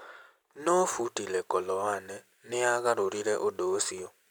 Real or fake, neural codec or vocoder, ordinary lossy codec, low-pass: real; none; none; none